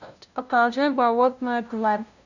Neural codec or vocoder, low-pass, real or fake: codec, 16 kHz, 0.5 kbps, FunCodec, trained on LibriTTS, 25 frames a second; 7.2 kHz; fake